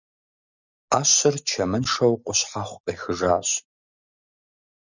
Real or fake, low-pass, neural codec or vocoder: real; 7.2 kHz; none